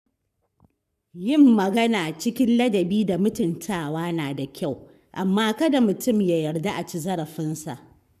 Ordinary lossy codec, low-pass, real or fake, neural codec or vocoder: none; 14.4 kHz; fake; codec, 44.1 kHz, 7.8 kbps, Pupu-Codec